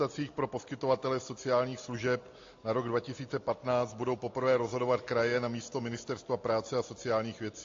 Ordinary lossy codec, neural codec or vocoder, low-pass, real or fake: AAC, 48 kbps; none; 7.2 kHz; real